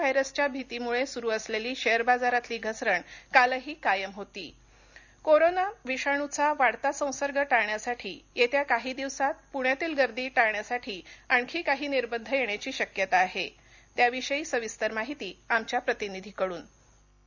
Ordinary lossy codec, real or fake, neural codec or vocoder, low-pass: none; real; none; 7.2 kHz